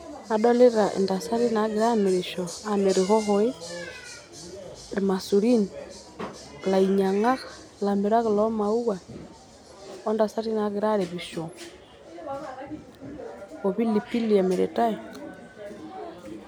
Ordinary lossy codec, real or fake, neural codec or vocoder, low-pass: none; real; none; 19.8 kHz